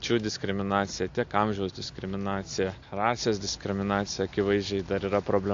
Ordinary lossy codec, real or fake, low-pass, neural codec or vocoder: AAC, 48 kbps; real; 7.2 kHz; none